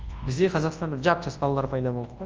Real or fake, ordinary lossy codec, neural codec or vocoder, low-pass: fake; Opus, 24 kbps; codec, 24 kHz, 0.9 kbps, WavTokenizer, large speech release; 7.2 kHz